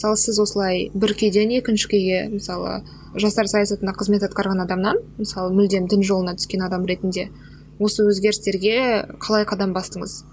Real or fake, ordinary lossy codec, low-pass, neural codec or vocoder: real; none; none; none